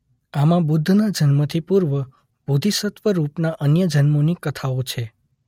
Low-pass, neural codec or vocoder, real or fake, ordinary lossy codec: 19.8 kHz; none; real; MP3, 64 kbps